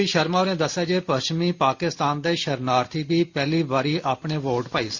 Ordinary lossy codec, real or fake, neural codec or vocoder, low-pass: Opus, 64 kbps; real; none; 7.2 kHz